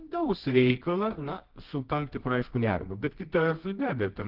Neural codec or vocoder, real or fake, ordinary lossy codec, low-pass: codec, 24 kHz, 0.9 kbps, WavTokenizer, medium music audio release; fake; Opus, 16 kbps; 5.4 kHz